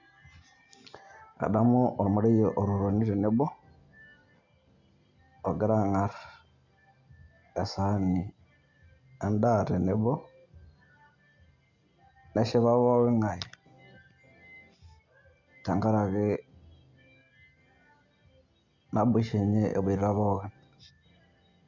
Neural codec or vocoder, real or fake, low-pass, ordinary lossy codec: none; real; 7.2 kHz; none